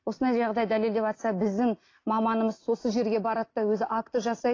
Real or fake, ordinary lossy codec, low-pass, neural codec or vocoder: real; AAC, 32 kbps; 7.2 kHz; none